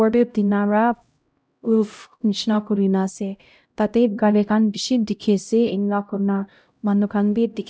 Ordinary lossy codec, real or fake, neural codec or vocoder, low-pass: none; fake; codec, 16 kHz, 0.5 kbps, X-Codec, HuBERT features, trained on LibriSpeech; none